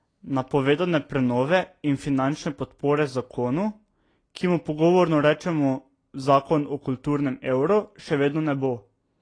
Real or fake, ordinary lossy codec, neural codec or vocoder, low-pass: real; AAC, 32 kbps; none; 9.9 kHz